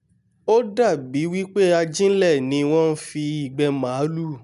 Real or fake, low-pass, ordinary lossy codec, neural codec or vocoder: real; 10.8 kHz; none; none